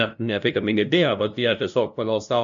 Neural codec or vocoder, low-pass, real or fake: codec, 16 kHz, 0.5 kbps, FunCodec, trained on LibriTTS, 25 frames a second; 7.2 kHz; fake